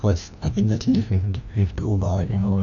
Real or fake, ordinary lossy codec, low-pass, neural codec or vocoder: fake; none; 7.2 kHz; codec, 16 kHz, 1 kbps, FreqCodec, larger model